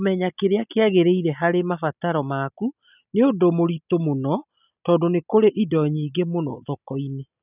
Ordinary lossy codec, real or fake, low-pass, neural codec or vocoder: none; real; 3.6 kHz; none